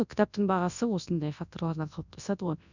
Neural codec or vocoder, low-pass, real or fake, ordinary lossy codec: codec, 24 kHz, 0.9 kbps, WavTokenizer, large speech release; 7.2 kHz; fake; none